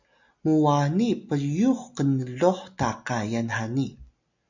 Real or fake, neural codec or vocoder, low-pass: real; none; 7.2 kHz